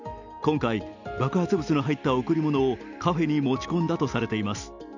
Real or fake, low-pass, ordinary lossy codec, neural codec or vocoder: real; 7.2 kHz; none; none